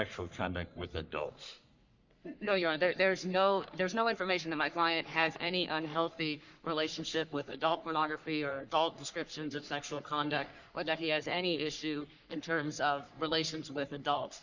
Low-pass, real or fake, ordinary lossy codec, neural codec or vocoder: 7.2 kHz; fake; Opus, 64 kbps; codec, 44.1 kHz, 3.4 kbps, Pupu-Codec